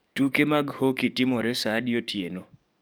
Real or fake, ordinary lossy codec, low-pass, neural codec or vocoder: fake; none; none; codec, 44.1 kHz, 7.8 kbps, DAC